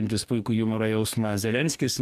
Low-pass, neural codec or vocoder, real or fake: 14.4 kHz; codec, 44.1 kHz, 2.6 kbps, DAC; fake